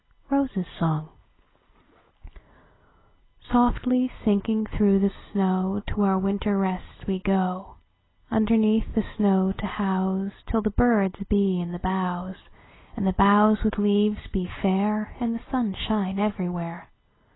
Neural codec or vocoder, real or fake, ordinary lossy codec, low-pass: none; real; AAC, 16 kbps; 7.2 kHz